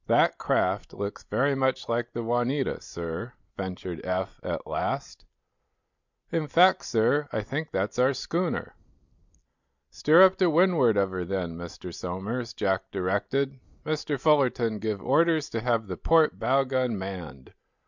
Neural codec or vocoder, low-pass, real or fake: none; 7.2 kHz; real